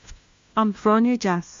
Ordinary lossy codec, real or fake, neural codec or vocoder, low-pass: MP3, 48 kbps; fake; codec, 16 kHz, 1 kbps, FunCodec, trained on LibriTTS, 50 frames a second; 7.2 kHz